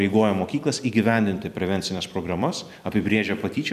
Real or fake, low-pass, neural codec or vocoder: fake; 14.4 kHz; vocoder, 44.1 kHz, 128 mel bands every 256 samples, BigVGAN v2